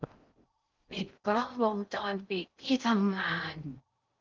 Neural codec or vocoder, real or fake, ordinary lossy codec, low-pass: codec, 16 kHz in and 24 kHz out, 0.6 kbps, FocalCodec, streaming, 4096 codes; fake; Opus, 24 kbps; 7.2 kHz